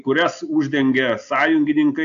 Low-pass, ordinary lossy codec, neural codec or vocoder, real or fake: 7.2 kHz; AAC, 64 kbps; none; real